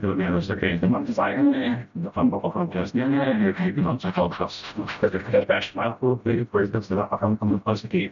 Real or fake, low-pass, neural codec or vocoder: fake; 7.2 kHz; codec, 16 kHz, 0.5 kbps, FreqCodec, smaller model